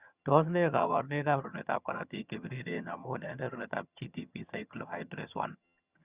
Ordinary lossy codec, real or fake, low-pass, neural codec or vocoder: Opus, 64 kbps; fake; 3.6 kHz; vocoder, 22.05 kHz, 80 mel bands, HiFi-GAN